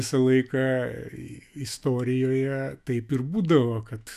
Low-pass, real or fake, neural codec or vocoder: 14.4 kHz; fake; autoencoder, 48 kHz, 128 numbers a frame, DAC-VAE, trained on Japanese speech